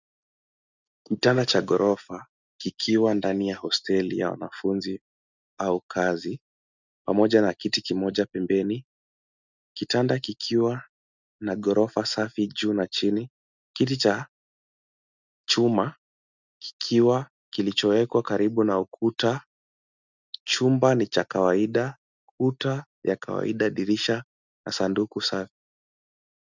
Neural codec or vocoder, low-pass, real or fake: none; 7.2 kHz; real